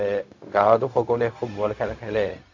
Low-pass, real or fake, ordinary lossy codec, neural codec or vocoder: 7.2 kHz; fake; AAC, 48 kbps; codec, 16 kHz, 0.4 kbps, LongCat-Audio-Codec